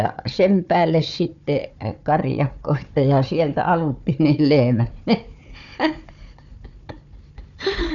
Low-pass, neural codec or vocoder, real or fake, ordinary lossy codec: 7.2 kHz; codec, 16 kHz, 4 kbps, FunCodec, trained on Chinese and English, 50 frames a second; fake; none